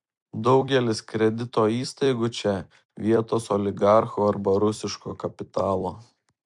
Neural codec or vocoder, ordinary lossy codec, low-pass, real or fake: vocoder, 44.1 kHz, 128 mel bands every 256 samples, BigVGAN v2; MP3, 64 kbps; 10.8 kHz; fake